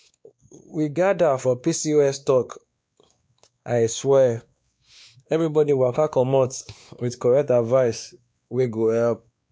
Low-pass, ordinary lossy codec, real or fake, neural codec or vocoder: none; none; fake; codec, 16 kHz, 2 kbps, X-Codec, WavLM features, trained on Multilingual LibriSpeech